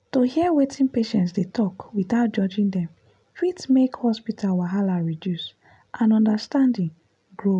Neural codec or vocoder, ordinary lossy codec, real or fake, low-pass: none; none; real; 10.8 kHz